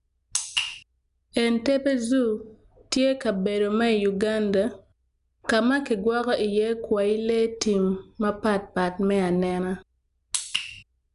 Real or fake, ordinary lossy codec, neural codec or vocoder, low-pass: real; none; none; 10.8 kHz